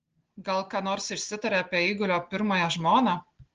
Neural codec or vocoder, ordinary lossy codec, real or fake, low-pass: none; Opus, 16 kbps; real; 7.2 kHz